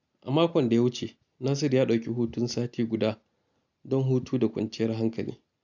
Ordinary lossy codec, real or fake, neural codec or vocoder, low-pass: none; real; none; 7.2 kHz